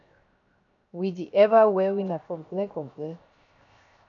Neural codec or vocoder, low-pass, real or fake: codec, 16 kHz, 0.7 kbps, FocalCodec; 7.2 kHz; fake